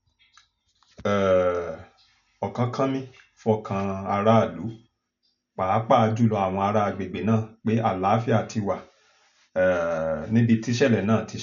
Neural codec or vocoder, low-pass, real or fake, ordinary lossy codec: none; 7.2 kHz; real; none